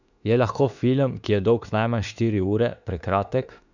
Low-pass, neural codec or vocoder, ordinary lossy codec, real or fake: 7.2 kHz; autoencoder, 48 kHz, 32 numbers a frame, DAC-VAE, trained on Japanese speech; none; fake